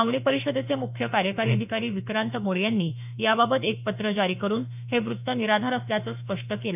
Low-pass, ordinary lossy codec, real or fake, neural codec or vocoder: 3.6 kHz; none; fake; autoencoder, 48 kHz, 32 numbers a frame, DAC-VAE, trained on Japanese speech